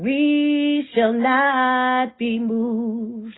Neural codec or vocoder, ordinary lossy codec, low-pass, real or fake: none; AAC, 16 kbps; 7.2 kHz; real